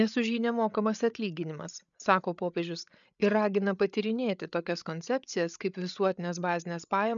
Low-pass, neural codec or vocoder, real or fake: 7.2 kHz; codec, 16 kHz, 8 kbps, FreqCodec, larger model; fake